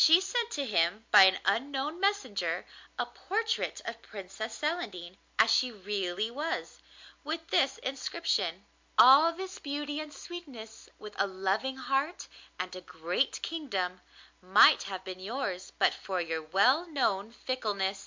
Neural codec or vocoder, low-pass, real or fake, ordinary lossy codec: none; 7.2 kHz; real; MP3, 64 kbps